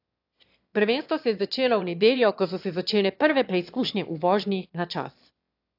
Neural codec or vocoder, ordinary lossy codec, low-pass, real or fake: autoencoder, 22.05 kHz, a latent of 192 numbers a frame, VITS, trained on one speaker; AAC, 48 kbps; 5.4 kHz; fake